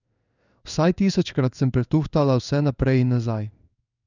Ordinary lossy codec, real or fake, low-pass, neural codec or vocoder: none; fake; 7.2 kHz; codec, 16 kHz in and 24 kHz out, 1 kbps, XY-Tokenizer